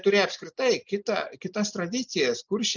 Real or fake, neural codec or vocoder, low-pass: real; none; 7.2 kHz